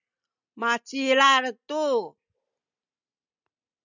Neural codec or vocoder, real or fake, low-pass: none; real; 7.2 kHz